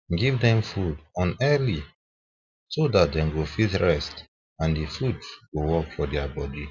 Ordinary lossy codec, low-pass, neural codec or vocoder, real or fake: none; 7.2 kHz; none; real